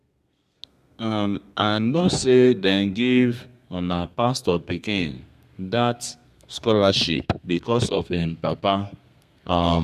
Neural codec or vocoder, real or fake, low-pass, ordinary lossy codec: codec, 32 kHz, 1.9 kbps, SNAC; fake; 14.4 kHz; MP3, 96 kbps